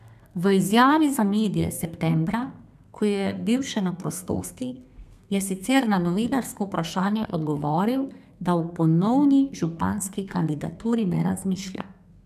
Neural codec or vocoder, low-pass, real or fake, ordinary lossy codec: codec, 32 kHz, 1.9 kbps, SNAC; 14.4 kHz; fake; none